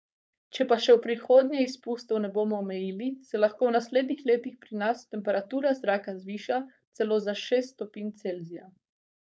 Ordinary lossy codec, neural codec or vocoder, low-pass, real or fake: none; codec, 16 kHz, 4.8 kbps, FACodec; none; fake